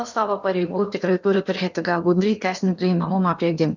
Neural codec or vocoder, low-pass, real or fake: codec, 16 kHz in and 24 kHz out, 0.8 kbps, FocalCodec, streaming, 65536 codes; 7.2 kHz; fake